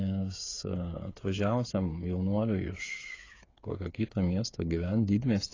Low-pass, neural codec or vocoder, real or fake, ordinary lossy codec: 7.2 kHz; codec, 16 kHz, 8 kbps, FreqCodec, smaller model; fake; AAC, 32 kbps